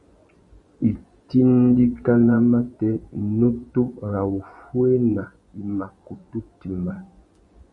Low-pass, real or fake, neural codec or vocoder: 10.8 kHz; fake; vocoder, 24 kHz, 100 mel bands, Vocos